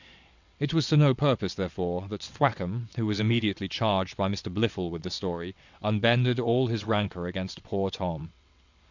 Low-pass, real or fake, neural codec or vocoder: 7.2 kHz; fake; vocoder, 22.05 kHz, 80 mel bands, WaveNeXt